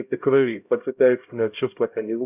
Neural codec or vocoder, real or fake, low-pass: codec, 16 kHz, 0.5 kbps, X-Codec, HuBERT features, trained on LibriSpeech; fake; 3.6 kHz